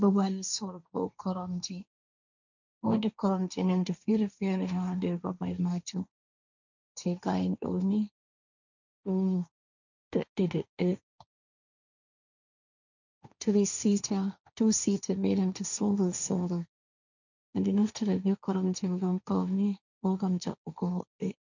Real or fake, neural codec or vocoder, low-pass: fake; codec, 16 kHz, 1.1 kbps, Voila-Tokenizer; 7.2 kHz